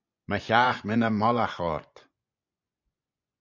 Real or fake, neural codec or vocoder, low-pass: fake; vocoder, 24 kHz, 100 mel bands, Vocos; 7.2 kHz